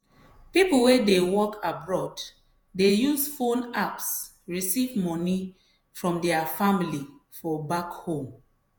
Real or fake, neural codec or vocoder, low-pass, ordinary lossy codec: fake; vocoder, 48 kHz, 128 mel bands, Vocos; none; none